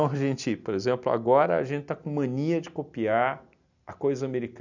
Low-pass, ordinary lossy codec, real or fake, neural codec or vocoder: 7.2 kHz; none; real; none